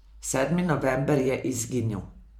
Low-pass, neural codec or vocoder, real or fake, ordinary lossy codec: 19.8 kHz; vocoder, 44.1 kHz, 128 mel bands every 512 samples, BigVGAN v2; fake; MP3, 96 kbps